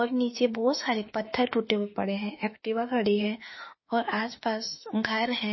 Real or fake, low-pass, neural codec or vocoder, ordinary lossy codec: fake; 7.2 kHz; autoencoder, 48 kHz, 32 numbers a frame, DAC-VAE, trained on Japanese speech; MP3, 24 kbps